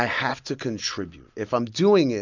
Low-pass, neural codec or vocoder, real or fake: 7.2 kHz; none; real